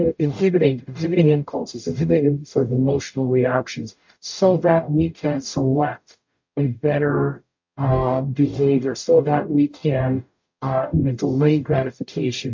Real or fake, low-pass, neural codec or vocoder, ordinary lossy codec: fake; 7.2 kHz; codec, 44.1 kHz, 0.9 kbps, DAC; MP3, 48 kbps